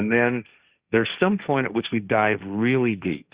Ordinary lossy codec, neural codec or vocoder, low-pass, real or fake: Opus, 64 kbps; codec, 16 kHz, 1.1 kbps, Voila-Tokenizer; 3.6 kHz; fake